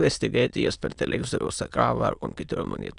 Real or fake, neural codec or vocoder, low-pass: fake; autoencoder, 22.05 kHz, a latent of 192 numbers a frame, VITS, trained on many speakers; 9.9 kHz